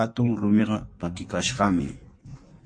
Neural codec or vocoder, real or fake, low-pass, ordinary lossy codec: codec, 16 kHz in and 24 kHz out, 1.1 kbps, FireRedTTS-2 codec; fake; 9.9 kHz; MP3, 48 kbps